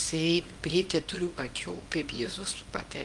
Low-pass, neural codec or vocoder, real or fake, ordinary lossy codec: 10.8 kHz; codec, 24 kHz, 0.9 kbps, WavTokenizer, small release; fake; Opus, 32 kbps